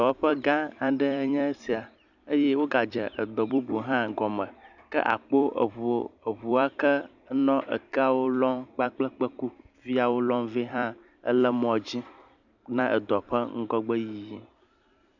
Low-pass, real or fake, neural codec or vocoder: 7.2 kHz; fake; vocoder, 44.1 kHz, 128 mel bands every 256 samples, BigVGAN v2